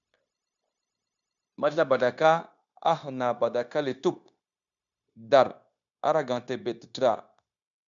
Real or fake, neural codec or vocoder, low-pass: fake; codec, 16 kHz, 0.9 kbps, LongCat-Audio-Codec; 7.2 kHz